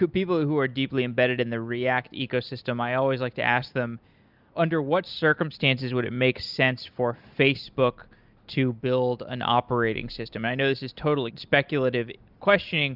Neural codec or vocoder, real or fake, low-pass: none; real; 5.4 kHz